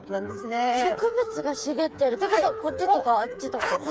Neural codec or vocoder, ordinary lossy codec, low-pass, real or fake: codec, 16 kHz, 4 kbps, FreqCodec, smaller model; none; none; fake